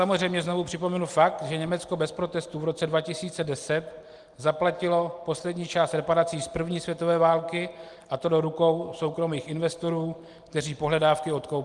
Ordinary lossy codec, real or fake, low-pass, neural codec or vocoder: Opus, 24 kbps; real; 10.8 kHz; none